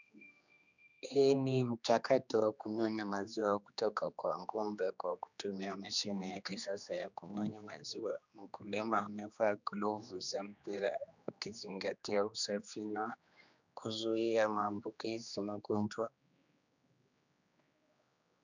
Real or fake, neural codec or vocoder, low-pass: fake; codec, 16 kHz, 2 kbps, X-Codec, HuBERT features, trained on general audio; 7.2 kHz